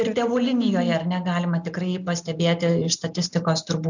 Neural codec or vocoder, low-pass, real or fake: none; 7.2 kHz; real